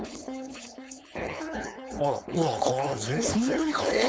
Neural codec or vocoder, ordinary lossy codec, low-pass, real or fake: codec, 16 kHz, 4.8 kbps, FACodec; none; none; fake